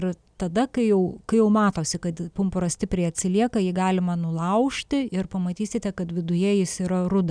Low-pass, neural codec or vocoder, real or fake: 9.9 kHz; none; real